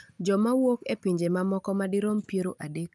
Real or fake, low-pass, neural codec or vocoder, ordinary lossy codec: real; none; none; none